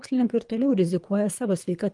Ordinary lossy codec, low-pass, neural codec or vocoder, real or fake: Opus, 32 kbps; 10.8 kHz; codec, 24 kHz, 3 kbps, HILCodec; fake